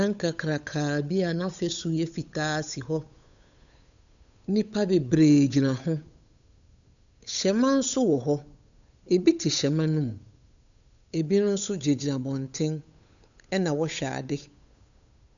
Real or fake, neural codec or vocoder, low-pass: fake; codec, 16 kHz, 8 kbps, FunCodec, trained on Chinese and English, 25 frames a second; 7.2 kHz